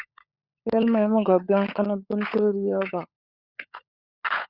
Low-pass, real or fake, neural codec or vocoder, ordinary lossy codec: 5.4 kHz; fake; codec, 16 kHz, 16 kbps, FunCodec, trained on LibriTTS, 50 frames a second; Opus, 64 kbps